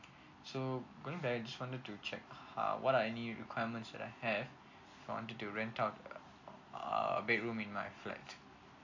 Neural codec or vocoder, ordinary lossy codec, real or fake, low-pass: none; AAC, 48 kbps; real; 7.2 kHz